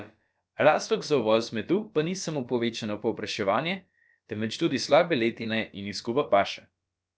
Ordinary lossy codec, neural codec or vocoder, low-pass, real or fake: none; codec, 16 kHz, about 1 kbps, DyCAST, with the encoder's durations; none; fake